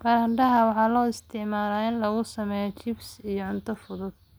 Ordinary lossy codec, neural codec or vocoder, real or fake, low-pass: none; none; real; none